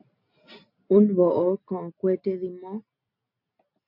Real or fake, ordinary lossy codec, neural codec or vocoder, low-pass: real; MP3, 32 kbps; none; 5.4 kHz